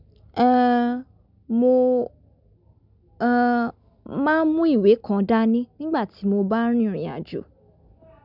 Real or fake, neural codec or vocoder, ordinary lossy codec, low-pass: real; none; none; 5.4 kHz